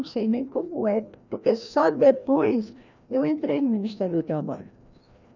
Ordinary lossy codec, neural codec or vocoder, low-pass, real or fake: none; codec, 16 kHz, 1 kbps, FreqCodec, larger model; 7.2 kHz; fake